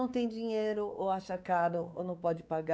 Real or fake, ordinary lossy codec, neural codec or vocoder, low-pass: fake; none; codec, 16 kHz, 4 kbps, X-Codec, WavLM features, trained on Multilingual LibriSpeech; none